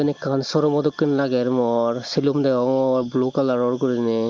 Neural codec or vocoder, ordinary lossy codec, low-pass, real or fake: none; Opus, 32 kbps; 7.2 kHz; real